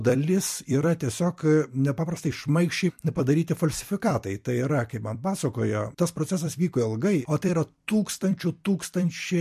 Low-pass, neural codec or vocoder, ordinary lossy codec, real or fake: 14.4 kHz; vocoder, 44.1 kHz, 128 mel bands every 256 samples, BigVGAN v2; MP3, 64 kbps; fake